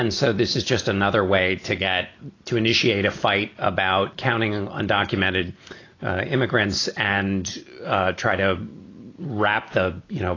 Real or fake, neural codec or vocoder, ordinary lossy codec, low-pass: real; none; AAC, 32 kbps; 7.2 kHz